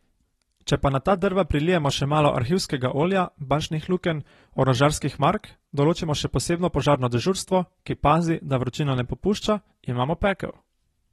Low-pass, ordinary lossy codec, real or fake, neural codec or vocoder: 19.8 kHz; AAC, 32 kbps; real; none